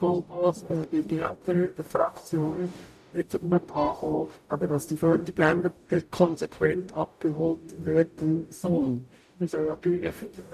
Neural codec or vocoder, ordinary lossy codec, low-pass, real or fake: codec, 44.1 kHz, 0.9 kbps, DAC; none; 14.4 kHz; fake